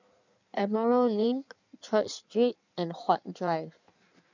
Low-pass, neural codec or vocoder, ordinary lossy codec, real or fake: 7.2 kHz; codec, 16 kHz in and 24 kHz out, 1.1 kbps, FireRedTTS-2 codec; none; fake